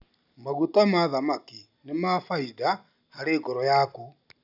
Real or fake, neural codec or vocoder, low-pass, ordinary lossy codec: real; none; 5.4 kHz; none